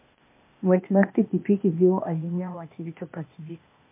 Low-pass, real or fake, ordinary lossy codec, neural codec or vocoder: 3.6 kHz; fake; MP3, 24 kbps; codec, 16 kHz, 0.8 kbps, ZipCodec